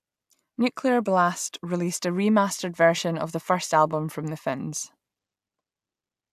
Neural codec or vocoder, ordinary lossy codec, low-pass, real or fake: none; AAC, 96 kbps; 14.4 kHz; real